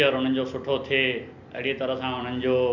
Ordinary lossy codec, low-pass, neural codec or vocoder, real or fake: none; 7.2 kHz; none; real